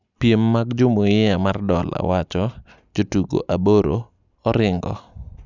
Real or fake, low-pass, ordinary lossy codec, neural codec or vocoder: real; 7.2 kHz; none; none